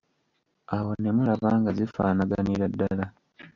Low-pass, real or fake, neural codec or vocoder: 7.2 kHz; real; none